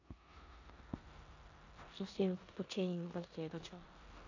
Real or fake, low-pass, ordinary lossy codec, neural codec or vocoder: fake; 7.2 kHz; AAC, 48 kbps; codec, 16 kHz in and 24 kHz out, 0.9 kbps, LongCat-Audio-Codec, four codebook decoder